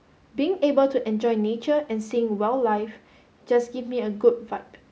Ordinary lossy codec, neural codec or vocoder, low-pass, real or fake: none; none; none; real